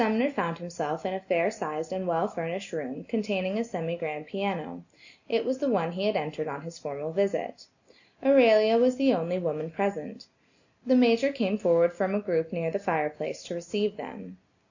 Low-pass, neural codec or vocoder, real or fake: 7.2 kHz; none; real